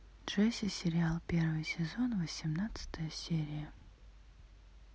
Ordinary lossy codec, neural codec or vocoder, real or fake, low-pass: none; none; real; none